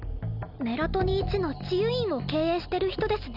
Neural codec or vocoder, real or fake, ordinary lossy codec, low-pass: none; real; none; 5.4 kHz